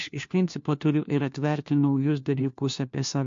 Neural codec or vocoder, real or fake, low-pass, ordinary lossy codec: codec, 16 kHz, 1 kbps, FunCodec, trained on LibriTTS, 50 frames a second; fake; 7.2 kHz; MP3, 48 kbps